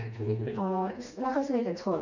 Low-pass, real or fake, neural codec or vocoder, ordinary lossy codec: 7.2 kHz; fake; codec, 16 kHz, 2 kbps, FreqCodec, smaller model; none